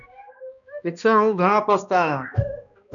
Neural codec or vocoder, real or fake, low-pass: codec, 16 kHz, 1 kbps, X-Codec, HuBERT features, trained on balanced general audio; fake; 7.2 kHz